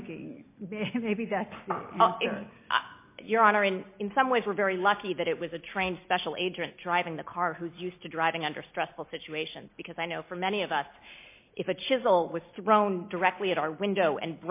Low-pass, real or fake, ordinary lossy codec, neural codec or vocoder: 3.6 kHz; real; MP3, 24 kbps; none